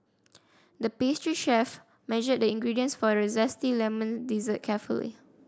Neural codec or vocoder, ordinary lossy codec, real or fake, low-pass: none; none; real; none